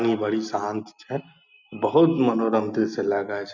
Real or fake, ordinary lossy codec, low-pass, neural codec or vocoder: real; none; 7.2 kHz; none